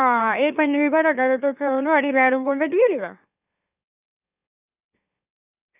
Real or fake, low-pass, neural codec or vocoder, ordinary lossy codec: fake; 3.6 kHz; autoencoder, 44.1 kHz, a latent of 192 numbers a frame, MeloTTS; none